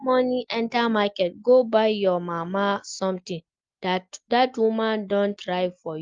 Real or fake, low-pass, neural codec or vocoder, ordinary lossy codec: real; 7.2 kHz; none; Opus, 32 kbps